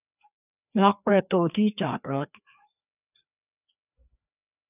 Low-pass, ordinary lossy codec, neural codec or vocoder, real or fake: 3.6 kHz; none; codec, 16 kHz, 2 kbps, FreqCodec, larger model; fake